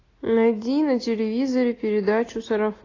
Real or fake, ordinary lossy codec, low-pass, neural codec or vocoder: real; AAC, 32 kbps; 7.2 kHz; none